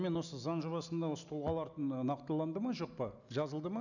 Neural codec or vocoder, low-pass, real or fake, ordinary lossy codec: none; 7.2 kHz; real; none